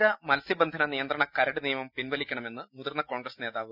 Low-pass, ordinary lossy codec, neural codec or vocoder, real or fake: 5.4 kHz; none; none; real